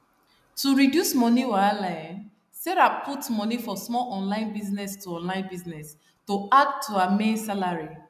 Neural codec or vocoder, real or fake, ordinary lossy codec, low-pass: none; real; none; 14.4 kHz